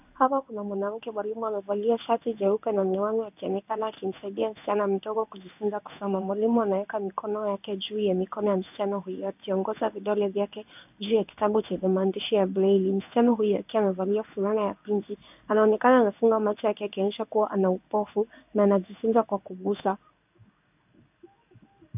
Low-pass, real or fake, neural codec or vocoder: 3.6 kHz; fake; codec, 16 kHz in and 24 kHz out, 1 kbps, XY-Tokenizer